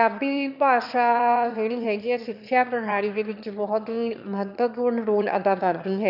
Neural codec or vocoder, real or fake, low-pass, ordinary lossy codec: autoencoder, 22.05 kHz, a latent of 192 numbers a frame, VITS, trained on one speaker; fake; 5.4 kHz; none